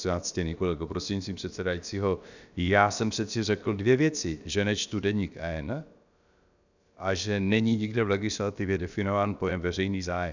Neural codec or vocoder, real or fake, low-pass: codec, 16 kHz, about 1 kbps, DyCAST, with the encoder's durations; fake; 7.2 kHz